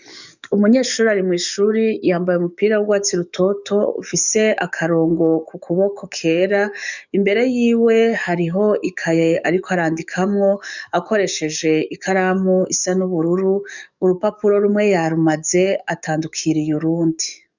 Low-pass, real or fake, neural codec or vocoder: 7.2 kHz; fake; codec, 44.1 kHz, 7.8 kbps, DAC